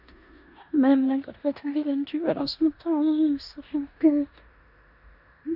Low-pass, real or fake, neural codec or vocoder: 5.4 kHz; fake; codec, 16 kHz in and 24 kHz out, 0.9 kbps, LongCat-Audio-Codec, four codebook decoder